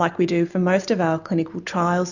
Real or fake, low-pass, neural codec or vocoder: real; 7.2 kHz; none